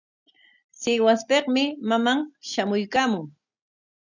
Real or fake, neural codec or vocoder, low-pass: real; none; 7.2 kHz